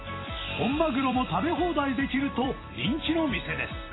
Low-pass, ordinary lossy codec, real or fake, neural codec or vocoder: 7.2 kHz; AAC, 16 kbps; fake; vocoder, 44.1 kHz, 128 mel bands every 256 samples, BigVGAN v2